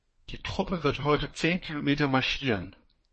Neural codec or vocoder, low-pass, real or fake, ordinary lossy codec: codec, 24 kHz, 1 kbps, SNAC; 10.8 kHz; fake; MP3, 32 kbps